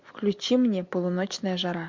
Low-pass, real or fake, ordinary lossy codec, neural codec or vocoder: 7.2 kHz; real; MP3, 48 kbps; none